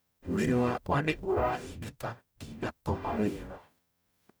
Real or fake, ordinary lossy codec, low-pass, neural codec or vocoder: fake; none; none; codec, 44.1 kHz, 0.9 kbps, DAC